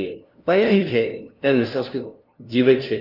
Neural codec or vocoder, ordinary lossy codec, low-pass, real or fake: codec, 16 kHz, 0.5 kbps, FunCodec, trained on LibriTTS, 25 frames a second; Opus, 32 kbps; 5.4 kHz; fake